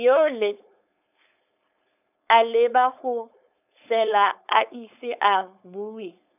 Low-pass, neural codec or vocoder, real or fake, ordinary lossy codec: 3.6 kHz; codec, 16 kHz, 4.8 kbps, FACodec; fake; none